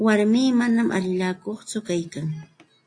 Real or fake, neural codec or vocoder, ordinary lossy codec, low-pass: real; none; AAC, 48 kbps; 9.9 kHz